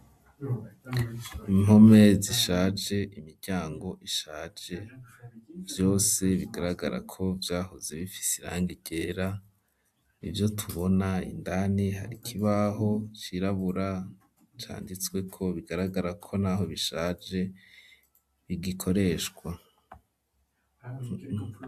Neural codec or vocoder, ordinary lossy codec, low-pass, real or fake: none; AAC, 96 kbps; 14.4 kHz; real